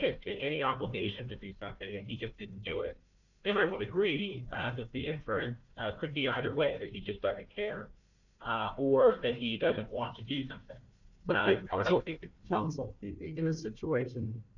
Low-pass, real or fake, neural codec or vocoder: 7.2 kHz; fake; codec, 16 kHz, 1 kbps, FunCodec, trained on Chinese and English, 50 frames a second